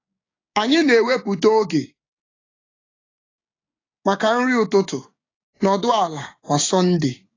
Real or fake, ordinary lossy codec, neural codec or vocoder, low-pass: fake; AAC, 32 kbps; codec, 16 kHz, 6 kbps, DAC; 7.2 kHz